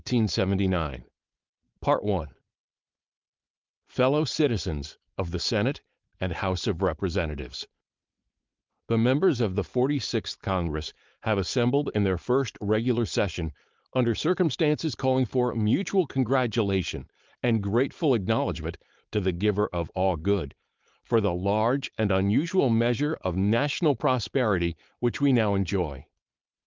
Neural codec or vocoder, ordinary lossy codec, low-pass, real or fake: codec, 16 kHz, 4.8 kbps, FACodec; Opus, 24 kbps; 7.2 kHz; fake